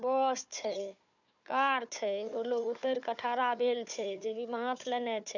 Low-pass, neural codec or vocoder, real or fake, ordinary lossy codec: 7.2 kHz; codec, 16 kHz, 4 kbps, FunCodec, trained on Chinese and English, 50 frames a second; fake; none